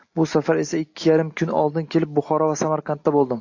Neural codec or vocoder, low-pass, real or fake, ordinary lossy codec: none; 7.2 kHz; real; AAC, 48 kbps